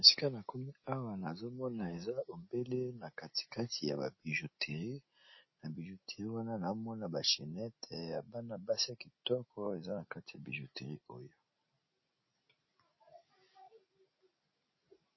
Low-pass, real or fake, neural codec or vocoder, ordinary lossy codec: 7.2 kHz; real; none; MP3, 24 kbps